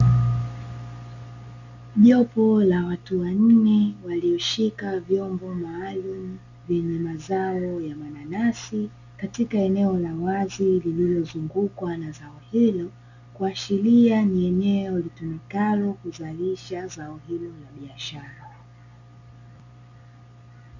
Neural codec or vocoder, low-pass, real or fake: none; 7.2 kHz; real